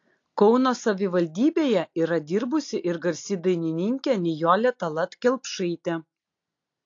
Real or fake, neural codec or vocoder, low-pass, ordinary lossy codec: real; none; 7.2 kHz; AAC, 48 kbps